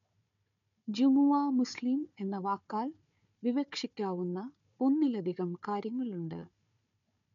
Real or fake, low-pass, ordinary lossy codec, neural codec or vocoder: fake; 7.2 kHz; none; codec, 16 kHz, 4 kbps, FunCodec, trained on Chinese and English, 50 frames a second